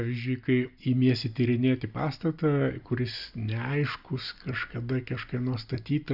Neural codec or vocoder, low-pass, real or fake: none; 5.4 kHz; real